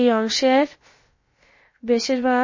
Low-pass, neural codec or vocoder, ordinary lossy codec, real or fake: 7.2 kHz; codec, 16 kHz, about 1 kbps, DyCAST, with the encoder's durations; MP3, 32 kbps; fake